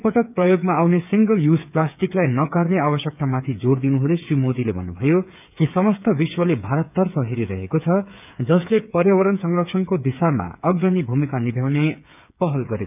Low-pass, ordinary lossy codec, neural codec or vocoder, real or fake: 3.6 kHz; none; codec, 16 kHz, 8 kbps, FreqCodec, smaller model; fake